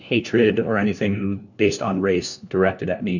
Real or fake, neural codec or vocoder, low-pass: fake; codec, 16 kHz, 1 kbps, FunCodec, trained on LibriTTS, 50 frames a second; 7.2 kHz